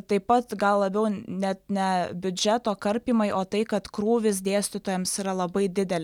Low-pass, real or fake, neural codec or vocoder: 19.8 kHz; real; none